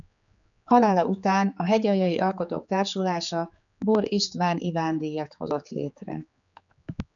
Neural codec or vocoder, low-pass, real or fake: codec, 16 kHz, 4 kbps, X-Codec, HuBERT features, trained on general audio; 7.2 kHz; fake